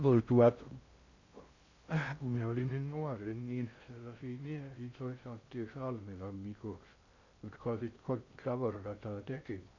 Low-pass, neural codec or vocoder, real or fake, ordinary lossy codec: 7.2 kHz; codec, 16 kHz in and 24 kHz out, 0.6 kbps, FocalCodec, streaming, 2048 codes; fake; Opus, 64 kbps